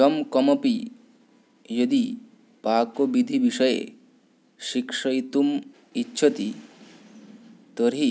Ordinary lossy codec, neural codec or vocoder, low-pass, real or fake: none; none; none; real